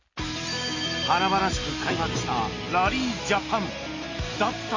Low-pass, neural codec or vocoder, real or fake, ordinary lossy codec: 7.2 kHz; none; real; MP3, 32 kbps